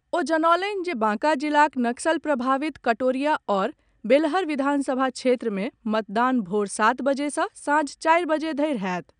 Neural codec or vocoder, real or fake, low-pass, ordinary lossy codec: none; real; 9.9 kHz; none